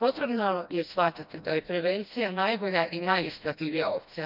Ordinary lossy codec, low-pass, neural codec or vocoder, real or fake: none; 5.4 kHz; codec, 16 kHz, 1 kbps, FreqCodec, smaller model; fake